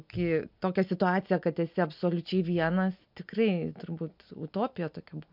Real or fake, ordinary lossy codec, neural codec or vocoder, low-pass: real; MP3, 48 kbps; none; 5.4 kHz